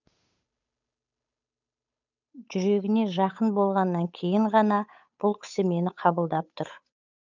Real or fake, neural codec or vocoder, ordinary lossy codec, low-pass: fake; codec, 16 kHz, 8 kbps, FunCodec, trained on Chinese and English, 25 frames a second; none; 7.2 kHz